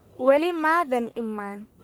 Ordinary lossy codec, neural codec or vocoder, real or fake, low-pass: none; codec, 44.1 kHz, 1.7 kbps, Pupu-Codec; fake; none